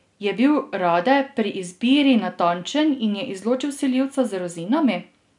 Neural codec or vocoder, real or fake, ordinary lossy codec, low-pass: none; real; none; 10.8 kHz